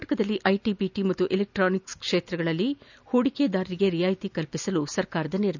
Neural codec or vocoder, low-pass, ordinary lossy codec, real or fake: none; 7.2 kHz; none; real